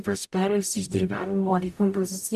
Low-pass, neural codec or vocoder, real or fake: 14.4 kHz; codec, 44.1 kHz, 0.9 kbps, DAC; fake